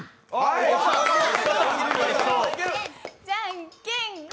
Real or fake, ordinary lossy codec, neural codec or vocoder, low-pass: real; none; none; none